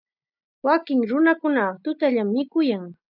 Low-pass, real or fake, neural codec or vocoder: 5.4 kHz; real; none